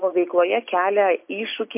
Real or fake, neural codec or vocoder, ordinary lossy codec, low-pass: real; none; MP3, 32 kbps; 3.6 kHz